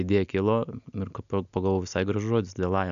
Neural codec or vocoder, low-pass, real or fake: none; 7.2 kHz; real